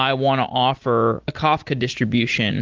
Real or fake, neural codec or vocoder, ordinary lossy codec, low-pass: real; none; Opus, 16 kbps; 7.2 kHz